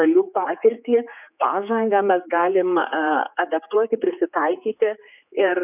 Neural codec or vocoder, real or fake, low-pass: codec, 16 kHz, 4 kbps, X-Codec, HuBERT features, trained on general audio; fake; 3.6 kHz